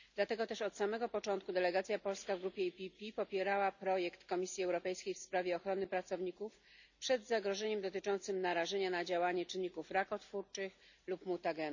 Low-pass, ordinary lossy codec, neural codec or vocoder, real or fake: 7.2 kHz; none; none; real